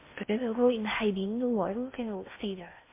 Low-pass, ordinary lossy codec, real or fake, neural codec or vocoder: 3.6 kHz; MP3, 24 kbps; fake; codec, 16 kHz in and 24 kHz out, 0.6 kbps, FocalCodec, streaming, 4096 codes